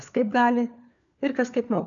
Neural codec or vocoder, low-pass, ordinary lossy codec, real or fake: codec, 16 kHz, 4 kbps, FunCodec, trained on LibriTTS, 50 frames a second; 7.2 kHz; MP3, 96 kbps; fake